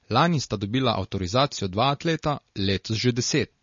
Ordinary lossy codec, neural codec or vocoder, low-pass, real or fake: MP3, 32 kbps; none; 7.2 kHz; real